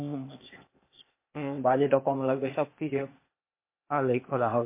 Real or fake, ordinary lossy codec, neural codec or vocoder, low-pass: fake; MP3, 24 kbps; codec, 16 kHz, 0.8 kbps, ZipCodec; 3.6 kHz